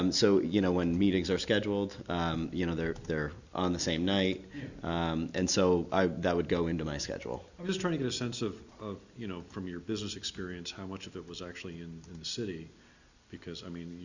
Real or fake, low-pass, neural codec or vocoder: real; 7.2 kHz; none